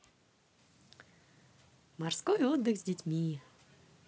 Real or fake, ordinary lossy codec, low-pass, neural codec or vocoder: real; none; none; none